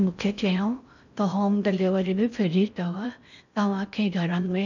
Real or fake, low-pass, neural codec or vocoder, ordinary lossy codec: fake; 7.2 kHz; codec, 16 kHz in and 24 kHz out, 0.6 kbps, FocalCodec, streaming, 4096 codes; none